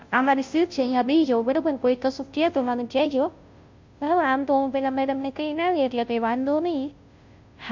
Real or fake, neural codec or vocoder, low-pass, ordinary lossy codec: fake; codec, 16 kHz, 0.5 kbps, FunCodec, trained on Chinese and English, 25 frames a second; 7.2 kHz; MP3, 48 kbps